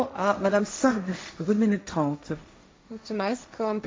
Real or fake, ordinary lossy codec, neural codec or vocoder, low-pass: fake; none; codec, 16 kHz, 1.1 kbps, Voila-Tokenizer; none